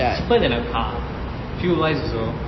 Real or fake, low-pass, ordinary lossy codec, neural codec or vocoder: real; 7.2 kHz; MP3, 24 kbps; none